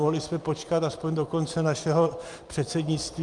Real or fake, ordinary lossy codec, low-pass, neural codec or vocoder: real; Opus, 32 kbps; 10.8 kHz; none